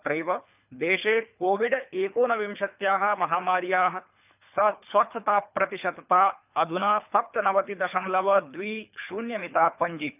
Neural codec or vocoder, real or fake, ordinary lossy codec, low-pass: codec, 24 kHz, 3 kbps, HILCodec; fake; none; 3.6 kHz